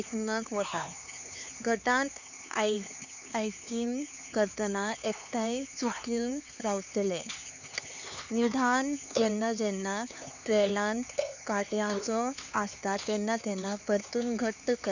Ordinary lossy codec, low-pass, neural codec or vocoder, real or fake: none; 7.2 kHz; codec, 16 kHz, 4 kbps, X-Codec, HuBERT features, trained on LibriSpeech; fake